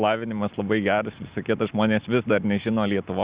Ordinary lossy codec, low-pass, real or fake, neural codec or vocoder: Opus, 64 kbps; 3.6 kHz; real; none